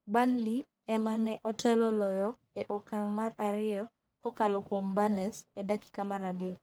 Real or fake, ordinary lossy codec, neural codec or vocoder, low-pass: fake; none; codec, 44.1 kHz, 1.7 kbps, Pupu-Codec; none